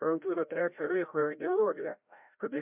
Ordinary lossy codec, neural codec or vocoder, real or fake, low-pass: MP3, 32 kbps; codec, 16 kHz, 0.5 kbps, FreqCodec, larger model; fake; 3.6 kHz